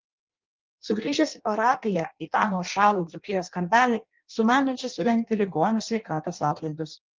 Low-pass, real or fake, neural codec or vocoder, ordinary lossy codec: 7.2 kHz; fake; codec, 16 kHz in and 24 kHz out, 0.6 kbps, FireRedTTS-2 codec; Opus, 32 kbps